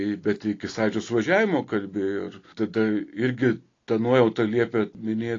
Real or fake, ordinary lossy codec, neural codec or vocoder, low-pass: real; AAC, 32 kbps; none; 7.2 kHz